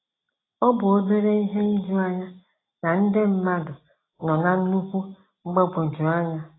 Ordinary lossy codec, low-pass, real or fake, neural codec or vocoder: AAC, 16 kbps; 7.2 kHz; real; none